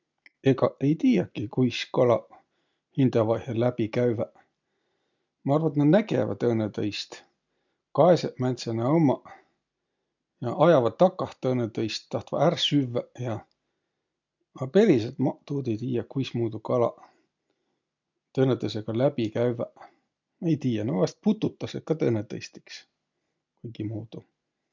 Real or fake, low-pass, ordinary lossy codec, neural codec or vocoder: real; 7.2 kHz; MP3, 64 kbps; none